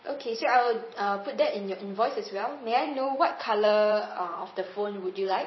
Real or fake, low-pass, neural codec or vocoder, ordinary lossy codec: fake; 7.2 kHz; vocoder, 44.1 kHz, 128 mel bands every 512 samples, BigVGAN v2; MP3, 24 kbps